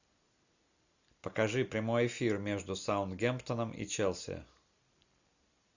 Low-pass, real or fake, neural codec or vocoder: 7.2 kHz; real; none